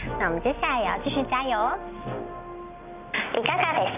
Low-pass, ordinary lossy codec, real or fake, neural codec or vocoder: 3.6 kHz; none; real; none